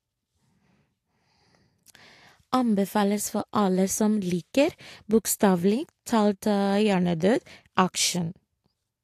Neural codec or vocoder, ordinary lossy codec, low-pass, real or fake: none; AAC, 64 kbps; 14.4 kHz; real